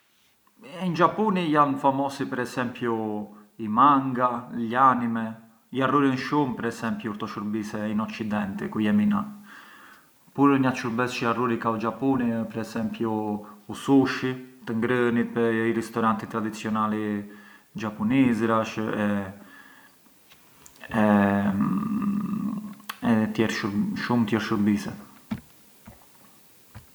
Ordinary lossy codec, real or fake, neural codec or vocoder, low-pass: none; real; none; none